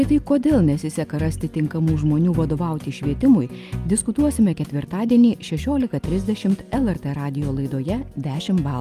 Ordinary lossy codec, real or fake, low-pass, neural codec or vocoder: Opus, 32 kbps; real; 14.4 kHz; none